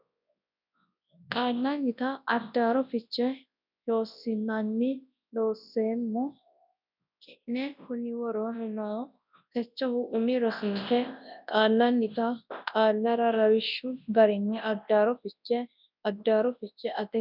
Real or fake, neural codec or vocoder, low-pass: fake; codec, 24 kHz, 0.9 kbps, WavTokenizer, large speech release; 5.4 kHz